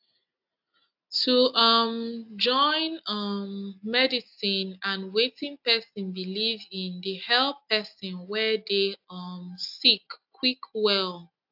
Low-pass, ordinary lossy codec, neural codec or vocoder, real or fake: 5.4 kHz; AAC, 48 kbps; none; real